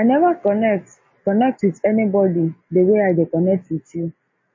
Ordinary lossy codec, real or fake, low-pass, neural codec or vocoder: MP3, 32 kbps; real; 7.2 kHz; none